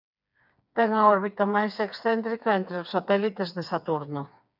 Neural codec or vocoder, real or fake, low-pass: codec, 16 kHz, 4 kbps, FreqCodec, smaller model; fake; 5.4 kHz